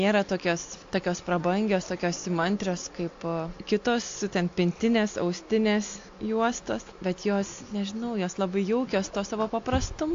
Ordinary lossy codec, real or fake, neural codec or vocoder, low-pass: AAC, 48 kbps; real; none; 7.2 kHz